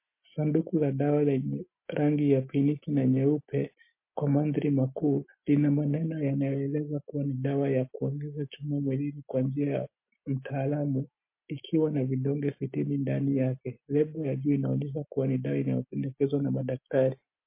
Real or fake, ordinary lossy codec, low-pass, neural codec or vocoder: real; MP3, 24 kbps; 3.6 kHz; none